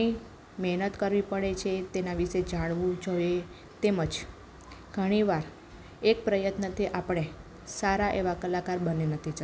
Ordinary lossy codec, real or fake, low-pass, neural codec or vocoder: none; real; none; none